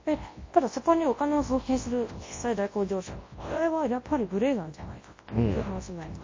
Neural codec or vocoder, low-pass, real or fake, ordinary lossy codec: codec, 24 kHz, 0.9 kbps, WavTokenizer, large speech release; 7.2 kHz; fake; MP3, 32 kbps